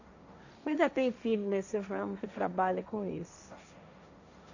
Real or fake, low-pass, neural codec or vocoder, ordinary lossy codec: fake; 7.2 kHz; codec, 16 kHz, 1.1 kbps, Voila-Tokenizer; none